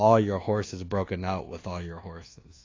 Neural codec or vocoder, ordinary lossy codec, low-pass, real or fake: codec, 24 kHz, 1.2 kbps, DualCodec; AAC, 32 kbps; 7.2 kHz; fake